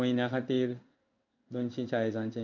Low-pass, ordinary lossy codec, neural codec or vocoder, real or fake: 7.2 kHz; none; codec, 16 kHz in and 24 kHz out, 1 kbps, XY-Tokenizer; fake